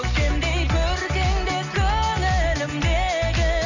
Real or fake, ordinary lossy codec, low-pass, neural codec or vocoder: real; none; 7.2 kHz; none